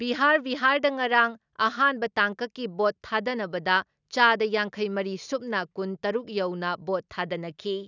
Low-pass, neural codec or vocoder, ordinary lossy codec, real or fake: 7.2 kHz; none; none; real